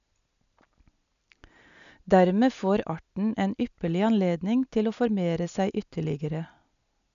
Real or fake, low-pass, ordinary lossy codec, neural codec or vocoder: real; 7.2 kHz; none; none